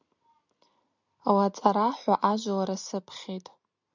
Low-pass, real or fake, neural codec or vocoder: 7.2 kHz; real; none